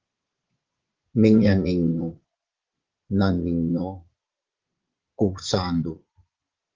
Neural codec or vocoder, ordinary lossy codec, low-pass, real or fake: vocoder, 22.05 kHz, 80 mel bands, Vocos; Opus, 16 kbps; 7.2 kHz; fake